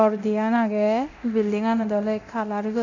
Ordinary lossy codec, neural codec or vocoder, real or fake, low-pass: none; codec, 24 kHz, 0.9 kbps, DualCodec; fake; 7.2 kHz